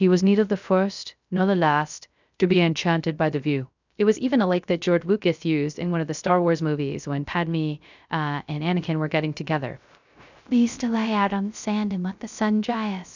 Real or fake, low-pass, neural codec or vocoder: fake; 7.2 kHz; codec, 16 kHz, 0.3 kbps, FocalCodec